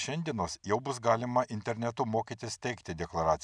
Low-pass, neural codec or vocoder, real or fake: 9.9 kHz; none; real